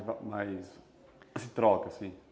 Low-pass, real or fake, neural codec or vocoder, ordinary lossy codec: none; real; none; none